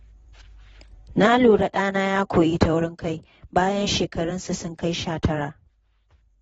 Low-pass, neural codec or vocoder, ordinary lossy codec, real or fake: 19.8 kHz; vocoder, 44.1 kHz, 128 mel bands every 512 samples, BigVGAN v2; AAC, 24 kbps; fake